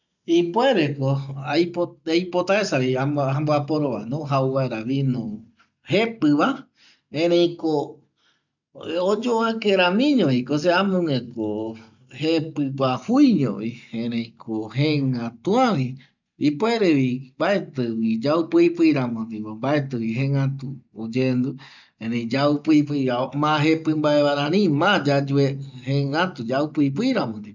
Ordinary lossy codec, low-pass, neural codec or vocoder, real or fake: none; 7.2 kHz; none; real